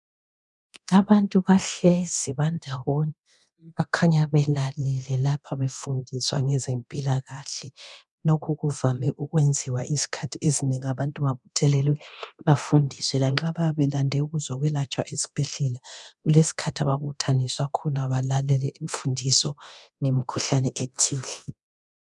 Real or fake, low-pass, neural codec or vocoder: fake; 10.8 kHz; codec, 24 kHz, 0.9 kbps, DualCodec